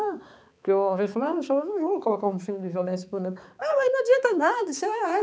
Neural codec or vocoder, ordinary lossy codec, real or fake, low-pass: codec, 16 kHz, 4 kbps, X-Codec, HuBERT features, trained on balanced general audio; none; fake; none